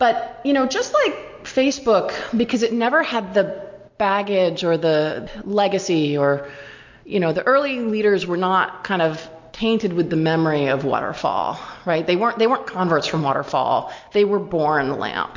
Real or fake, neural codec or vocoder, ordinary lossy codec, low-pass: real; none; MP3, 48 kbps; 7.2 kHz